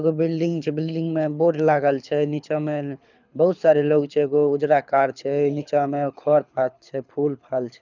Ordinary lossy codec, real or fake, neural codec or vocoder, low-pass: none; fake; codec, 24 kHz, 6 kbps, HILCodec; 7.2 kHz